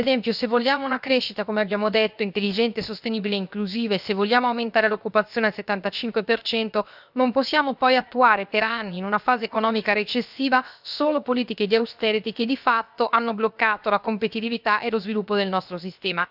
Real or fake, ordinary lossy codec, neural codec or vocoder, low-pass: fake; none; codec, 16 kHz, 0.7 kbps, FocalCodec; 5.4 kHz